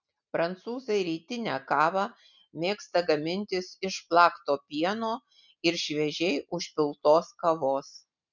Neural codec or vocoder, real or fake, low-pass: none; real; 7.2 kHz